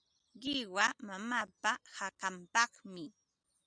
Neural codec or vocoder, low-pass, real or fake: none; 9.9 kHz; real